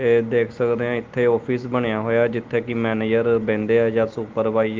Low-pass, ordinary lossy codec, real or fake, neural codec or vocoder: 7.2 kHz; Opus, 32 kbps; real; none